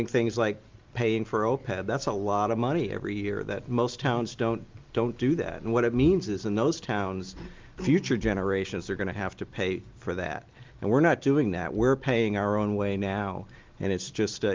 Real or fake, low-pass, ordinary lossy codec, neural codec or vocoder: real; 7.2 kHz; Opus, 32 kbps; none